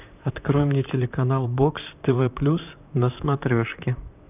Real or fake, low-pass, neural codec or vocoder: fake; 3.6 kHz; vocoder, 44.1 kHz, 128 mel bands, Pupu-Vocoder